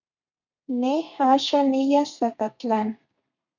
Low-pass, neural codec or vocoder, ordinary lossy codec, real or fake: 7.2 kHz; codec, 32 kHz, 1.9 kbps, SNAC; MP3, 64 kbps; fake